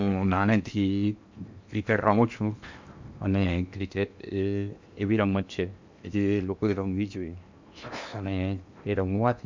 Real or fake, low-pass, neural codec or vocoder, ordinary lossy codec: fake; 7.2 kHz; codec, 16 kHz in and 24 kHz out, 0.8 kbps, FocalCodec, streaming, 65536 codes; MP3, 64 kbps